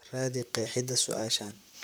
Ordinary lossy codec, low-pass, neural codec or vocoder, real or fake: none; none; vocoder, 44.1 kHz, 128 mel bands, Pupu-Vocoder; fake